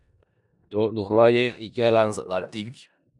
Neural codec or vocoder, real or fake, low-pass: codec, 16 kHz in and 24 kHz out, 0.4 kbps, LongCat-Audio-Codec, four codebook decoder; fake; 10.8 kHz